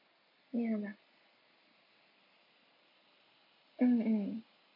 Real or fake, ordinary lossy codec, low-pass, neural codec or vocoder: real; MP3, 24 kbps; 5.4 kHz; none